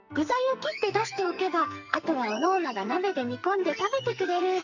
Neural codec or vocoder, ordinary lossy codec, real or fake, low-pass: codec, 44.1 kHz, 2.6 kbps, SNAC; none; fake; 7.2 kHz